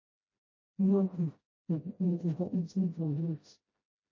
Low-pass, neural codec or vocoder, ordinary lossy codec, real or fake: 7.2 kHz; codec, 16 kHz, 0.5 kbps, FreqCodec, smaller model; MP3, 32 kbps; fake